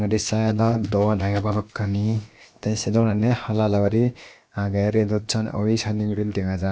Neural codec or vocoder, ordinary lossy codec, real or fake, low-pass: codec, 16 kHz, about 1 kbps, DyCAST, with the encoder's durations; none; fake; none